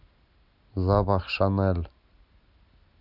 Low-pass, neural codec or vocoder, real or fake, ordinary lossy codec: 5.4 kHz; none; real; none